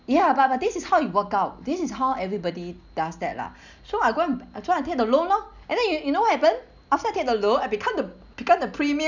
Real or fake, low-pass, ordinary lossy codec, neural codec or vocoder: real; 7.2 kHz; none; none